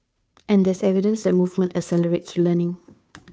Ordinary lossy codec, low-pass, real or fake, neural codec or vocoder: none; none; fake; codec, 16 kHz, 2 kbps, FunCodec, trained on Chinese and English, 25 frames a second